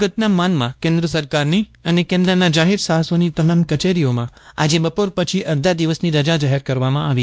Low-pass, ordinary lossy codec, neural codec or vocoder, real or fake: none; none; codec, 16 kHz, 1 kbps, X-Codec, WavLM features, trained on Multilingual LibriSpeech; fake